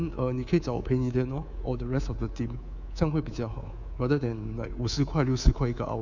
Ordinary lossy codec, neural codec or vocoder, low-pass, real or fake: none; codec, 24 kHz, 3.1 kbps, DualCodec; 7.2 kHz; fake